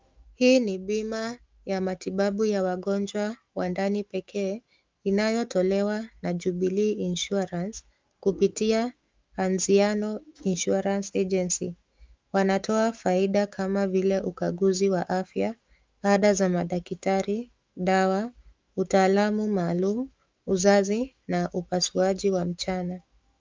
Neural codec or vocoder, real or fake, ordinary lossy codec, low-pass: autoencoder, 48 kHz, 128 numbers a frame, DAC-VAE, trained on Japanese speech; fake; Opus, 24 kbps; 7.2 kHz